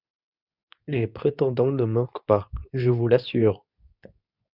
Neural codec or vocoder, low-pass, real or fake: codec, 24 kHz, 0.9 kbps, WavTokenizer, medium speech release version 2; 5.4 kHz; fake